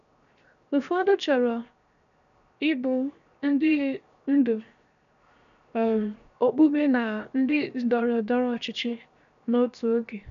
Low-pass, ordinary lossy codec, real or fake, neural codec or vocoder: 7.2 kHz; none; fake; codec, 16 kHz, 0.7 kbps, FocalCodec